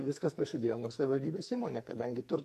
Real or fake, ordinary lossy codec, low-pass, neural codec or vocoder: fake; AAC, 64 kbps; 14.4 kHz; codec, 44.1 kHz, 2.6 kbps, SNAC